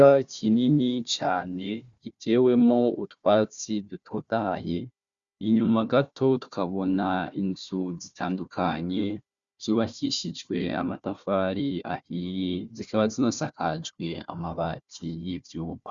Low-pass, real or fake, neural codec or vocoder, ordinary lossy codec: 7.2 kHz; fake; codec, 16 kHz, 1 kbps, FunCodec, trained on Chinese and English, 50 frames a second; Opus, 64 kbps